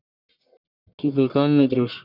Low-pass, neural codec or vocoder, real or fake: 5.4 kHz; codec, 44.1 kHz, 1.7 kbps, Pupu-Codec; fake